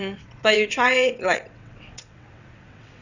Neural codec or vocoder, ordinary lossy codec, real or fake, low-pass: vocoder, 22.05 kHz, 80 mel bands, WaveNeXt; none; fake; 7.2 kHz